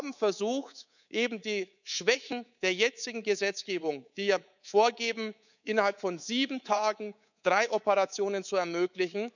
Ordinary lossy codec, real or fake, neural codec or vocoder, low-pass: none; fake; codec, 24 kHz, 3.1 kbps, DualCodec; 7.2 kHz